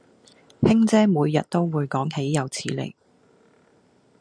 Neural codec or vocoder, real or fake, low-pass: none; real; 9.9 kHz